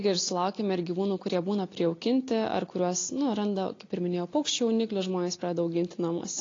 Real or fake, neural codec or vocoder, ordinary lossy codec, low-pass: real; none; AAC, 32 kbps; 7.2 kHz